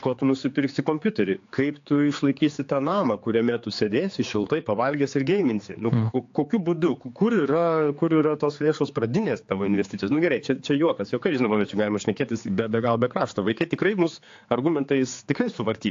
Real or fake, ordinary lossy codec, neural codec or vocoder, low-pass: fake; AAC, 48 kbps; codec, 16 kHz, 4 kbps, X-Codec, HuBERT features, trained on general audio; 7.2 kHz